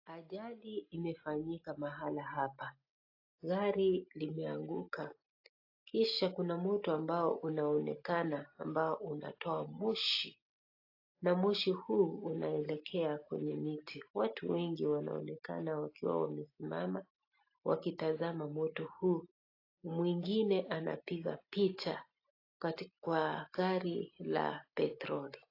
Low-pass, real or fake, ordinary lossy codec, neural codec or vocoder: 5.4 kHz; real; AAC, 32 kbps; none